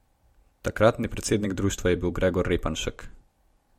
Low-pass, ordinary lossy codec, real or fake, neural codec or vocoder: 19.8 kHz; MP3, 64 kbps; fake; vocoder, 44.1 kHz, 128 mel bands every 256 samples, BigVGAN v2